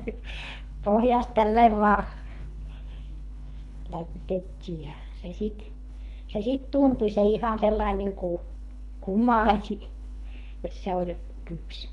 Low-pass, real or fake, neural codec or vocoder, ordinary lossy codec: 10.8 kHz; fake; codec, 24 kHz, 3 kbps, HILCodec; none